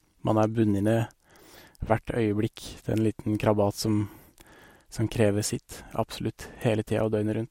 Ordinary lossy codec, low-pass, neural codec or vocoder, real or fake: MP3, 64 kbps; 19.8 kHz; none; real